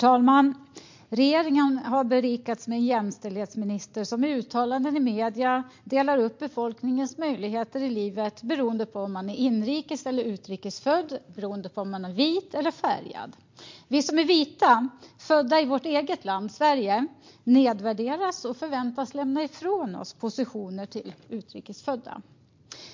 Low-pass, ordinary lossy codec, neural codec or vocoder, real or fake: 7.2 kHz; MP3, 48 kbps; none; real